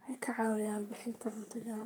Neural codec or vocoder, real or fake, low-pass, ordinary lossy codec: codec, 44.1 kHz, 3.4 kbps, Pupu-Codec; fake; none; none